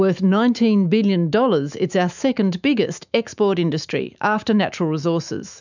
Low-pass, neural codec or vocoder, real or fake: 7.2 kHz; autoencoder, 48 kHz, 128 numbers a frame, DAC-VAE, trained on Japanese speech; fake